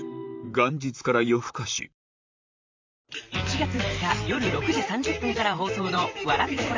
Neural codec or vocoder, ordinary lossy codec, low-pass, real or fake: vocoder, 44.1 kHz, 128 mel bands, Pupu-Vocoder; MP3, 64 kbps; 7.2 kHz; fake